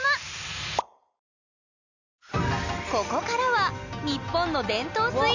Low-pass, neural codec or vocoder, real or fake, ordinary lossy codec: 7.2 kHz; none; real; none